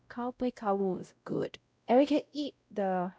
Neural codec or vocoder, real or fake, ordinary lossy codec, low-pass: codec, 16 kHz, 0.5 kbps, X-Codec, WavLM features, trained on Multilingual LibriSpeech; fake; none; none